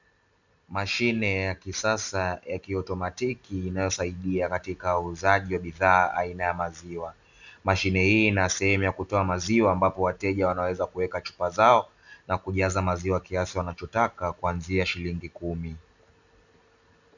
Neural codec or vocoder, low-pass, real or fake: none; 7.2 kHz; real